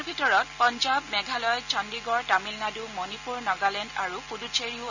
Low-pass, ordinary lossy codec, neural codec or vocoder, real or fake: 7.2 kHz; none; none; real